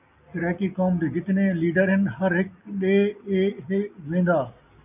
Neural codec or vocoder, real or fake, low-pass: none; real; 3.6 kHz